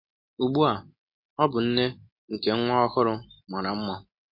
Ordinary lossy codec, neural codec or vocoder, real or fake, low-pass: MP3, 32 kbps; none; real; 5.4 kHz